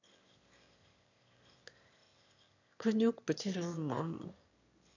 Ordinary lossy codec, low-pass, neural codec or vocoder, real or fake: none; 7.2 kHz; autoencoder, 22.05 kHz, a latent of 192 numbers a frame, VITS, trained on one speaker; fake